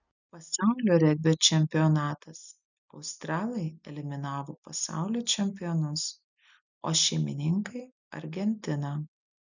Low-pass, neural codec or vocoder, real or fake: 7.2 kHz; none; real